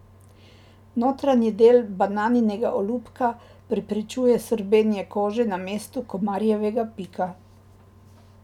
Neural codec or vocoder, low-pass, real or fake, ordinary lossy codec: none; 19.8 kHz; real; none